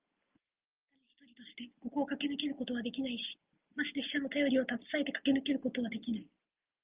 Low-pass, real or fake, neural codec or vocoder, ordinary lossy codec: 3.6 kHz; real; none; Opus, 24 kbps